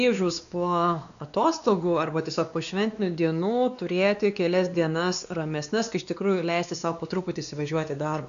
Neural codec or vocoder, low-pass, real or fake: codec, 16 kHz, 2 kbps, X-Codec, WavLM features, trained on Multilingual LibriSpeech; 7.2 kHz; fake